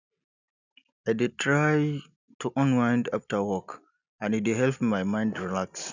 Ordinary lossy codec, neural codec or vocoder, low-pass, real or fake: none; none; 7.2 kHz; real